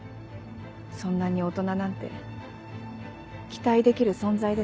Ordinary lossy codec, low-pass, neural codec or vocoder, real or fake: none; none; none; real